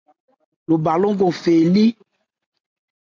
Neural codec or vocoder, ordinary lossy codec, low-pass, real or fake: none; MP3, 64 kbps; 7.2 kHz; real